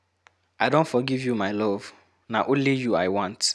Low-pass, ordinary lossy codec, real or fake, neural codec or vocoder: none; none; real; none